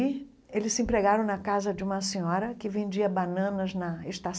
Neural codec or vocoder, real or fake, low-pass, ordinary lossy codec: none; real; none; none